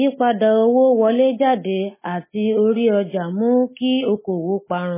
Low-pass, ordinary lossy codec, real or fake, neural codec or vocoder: 3.6 kHz; MP3, 16 kbps; real; none